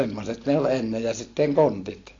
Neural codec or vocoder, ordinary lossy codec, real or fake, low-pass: none; AAC, 32 kbps; real; 7.2 kHz